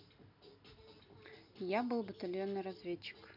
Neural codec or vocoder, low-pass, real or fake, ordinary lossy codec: none; 5.4 kHz; real; none